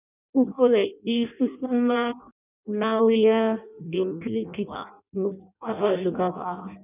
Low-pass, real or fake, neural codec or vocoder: 3.6 kHz; fake; codec, 16 kHz in and 24 kHz out, 0.6 kbps, FireRedTTS-2 codec